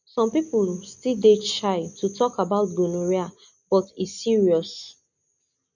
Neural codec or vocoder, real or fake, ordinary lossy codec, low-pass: none; real; none; 7.2 kHz